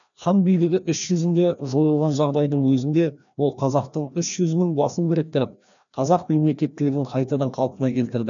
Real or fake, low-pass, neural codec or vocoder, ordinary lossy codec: fake; 7.2 kHz; codec, 16 kHz, 1 kbps, FreqCodec, larger model; none